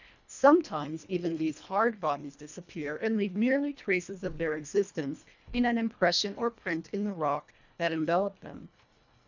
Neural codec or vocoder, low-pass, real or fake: codec, 24 kHz, 1.5 kbps, HILCodec; 7.2 kHz; fake